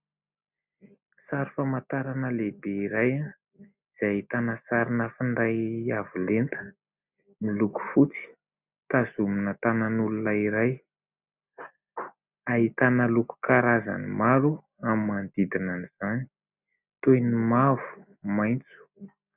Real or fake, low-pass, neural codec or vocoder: real; 3.6 kHz; none